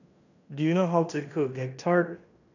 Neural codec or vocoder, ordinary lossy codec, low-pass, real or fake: codec, 16 kHz in and 24 kHz out, 0.9 kbps, LongCat-Audio-Codec, fine tuned four codebook decoder; none; 7.2 kHz; fake